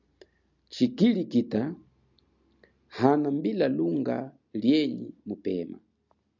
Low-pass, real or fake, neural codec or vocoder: 7.2 kHz; real; none